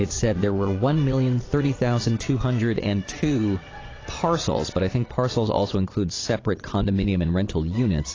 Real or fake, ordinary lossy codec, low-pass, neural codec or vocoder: fake; AAC, 32 kbps; 7.2 kHz; vocoder, 22.05 kHz, 80 mel bands, Vocos